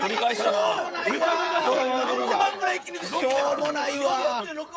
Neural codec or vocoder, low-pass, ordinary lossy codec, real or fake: codec, 16 kHz, 16 kbps, FreqCodec, smaller model; none; none; fake